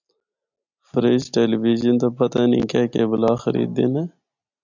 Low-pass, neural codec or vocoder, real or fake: 7.2 kHz; none; real